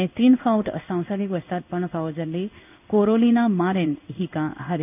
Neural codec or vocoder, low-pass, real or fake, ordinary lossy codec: codec, 16 kHz in and 24 kHz out, 1 kbps, XY-Tokenizer; 3.6 kHz; fake; none